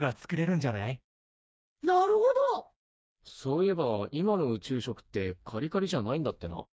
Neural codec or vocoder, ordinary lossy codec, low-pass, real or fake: codec, 16 kHz, 2 kbps, FreqCodec, smaller model; none; none; fake